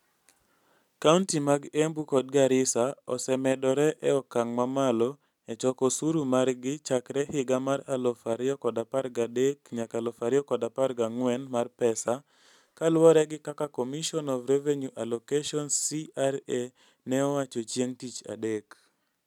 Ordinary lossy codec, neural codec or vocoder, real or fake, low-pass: none; none; real; 19.8 kHz